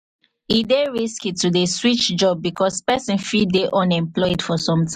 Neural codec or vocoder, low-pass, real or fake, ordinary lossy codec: none; 14.4 kHz; real; MP3, 64 kbps